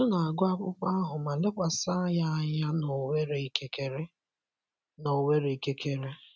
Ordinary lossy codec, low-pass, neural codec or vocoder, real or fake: none; none; none; real